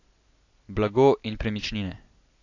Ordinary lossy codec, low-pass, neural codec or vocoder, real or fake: AAC, 48 kbps; 7.2 kHz; none; real